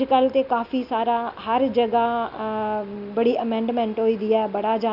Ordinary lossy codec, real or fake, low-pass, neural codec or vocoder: none; real; 5.4 kHz; none